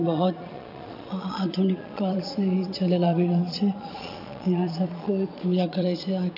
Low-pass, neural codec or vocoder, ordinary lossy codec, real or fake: 5.4 kHz; vocoder, 22.05 kHz, 80 mel bands, Vocos; none; fake